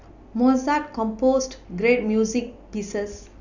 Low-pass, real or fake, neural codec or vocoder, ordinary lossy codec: 7.2 kHz; real; none; none